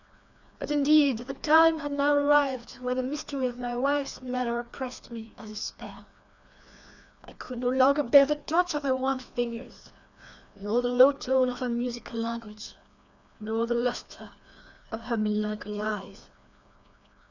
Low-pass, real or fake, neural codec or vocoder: 7.2 kHz; fake; codec, 16 kHz, 2 kbps, FreqCodec, larger model